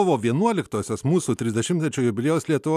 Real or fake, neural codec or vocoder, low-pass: real; none; 14.4 kHz